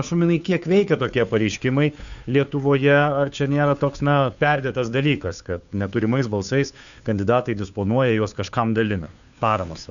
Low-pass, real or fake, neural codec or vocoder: 7.2 kHz; fake; codec, 16 kHz, 2 kbps, FunCodec, trained on Chinese and English, 25 frames a second